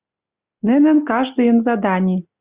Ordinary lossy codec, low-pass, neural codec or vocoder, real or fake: Opus, 64 kbps; 3.6 kHz; none; real